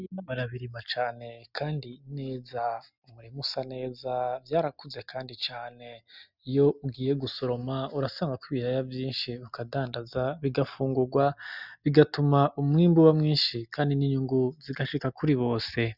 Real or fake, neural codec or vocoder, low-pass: real; none; 5.4 kHz